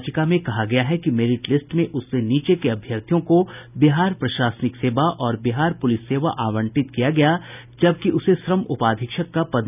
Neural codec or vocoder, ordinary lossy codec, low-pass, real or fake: none; none; 3.6 kHz; real